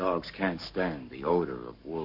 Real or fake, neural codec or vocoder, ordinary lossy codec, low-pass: fake; codec, 44.1 kHz, 7.8 kbps, Pupu-Codec; MP3, 32 kbps; 5.4 kHz